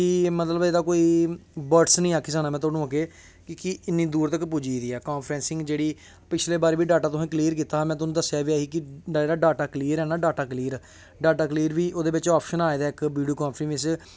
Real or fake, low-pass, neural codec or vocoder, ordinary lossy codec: real; none; none; none